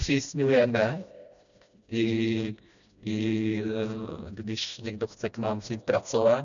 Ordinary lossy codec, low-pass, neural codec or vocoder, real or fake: MP3, 96 kbps; 7.2 kHz; codec, 16 kHz, 1 kbps, FreqCodec, smaller model; fake